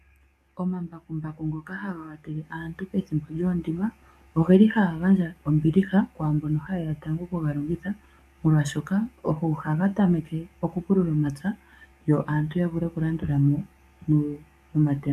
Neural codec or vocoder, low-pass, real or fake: codec, 44.1 kHz, 7.8 kbps, DAC; 14.4 kHz; fake